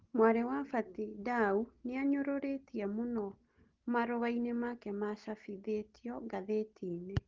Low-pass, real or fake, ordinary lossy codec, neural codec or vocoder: 7.2 kHz; real; Opus, 16 kbps; none